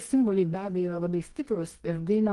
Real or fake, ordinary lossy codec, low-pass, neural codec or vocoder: fake; Opus, 24 kbps; 10.8 kHz; codec, 24 kHz, 0.9 kbps, WavTokenizer, medium music audio release